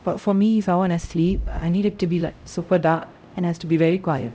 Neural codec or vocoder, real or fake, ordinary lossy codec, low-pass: codec, 16 kHz, 0.5 kbps, X-Codec, HuBERT features, trained on LibriSpeech; fake; none; none